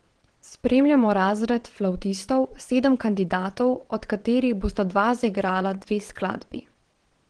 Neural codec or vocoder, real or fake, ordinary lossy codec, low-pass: vocoder, 22.05 kHz, 80 mel bands, WaveNeXt; fake; Opus, 16 kbps; 9.9 kHz